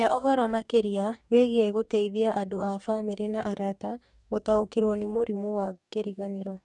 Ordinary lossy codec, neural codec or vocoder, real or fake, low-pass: none; codec, 44.1 kHz, 2.6 kbps, DAC; fake; 10.8 kHz